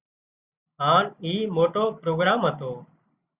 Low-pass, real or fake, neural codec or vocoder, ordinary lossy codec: 3.6 kHz; real; none; Opus, 64 kbps